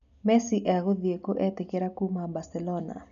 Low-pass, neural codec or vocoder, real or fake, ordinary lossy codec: 7.2 kHz; none; real; none